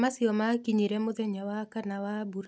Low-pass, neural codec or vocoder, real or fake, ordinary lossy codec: none; none; real; none